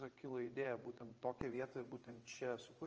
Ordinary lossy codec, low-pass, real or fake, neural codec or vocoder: Opus, 24 kbps; 7.2 kHz; fake; vocoder, 22.05 kHz, 80 mel bands, WaveNeXt